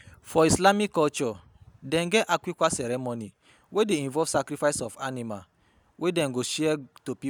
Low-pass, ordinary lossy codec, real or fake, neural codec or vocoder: none; none; real; none